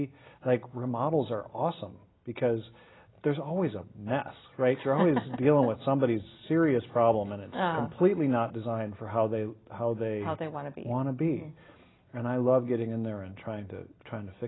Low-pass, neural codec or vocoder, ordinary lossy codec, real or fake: 7.2 kHz; none; AAC, 16 kbps; real